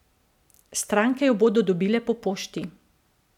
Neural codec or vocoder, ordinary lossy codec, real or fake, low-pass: none; none; real; 19.8 kHz